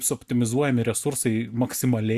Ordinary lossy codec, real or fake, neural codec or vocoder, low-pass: Opus, 64 kbps; fake; vocoder, 44.1 kHz, 128 mel bands every 256 samples, BigVGAN v2; 14.4 kHz